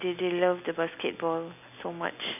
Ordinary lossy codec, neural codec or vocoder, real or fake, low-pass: none; none; real; 3.6 kHz